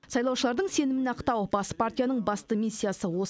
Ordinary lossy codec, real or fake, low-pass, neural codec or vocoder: none; real; none; none